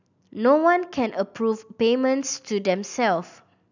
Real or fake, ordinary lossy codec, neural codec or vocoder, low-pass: real; none; none; 7.2 kHz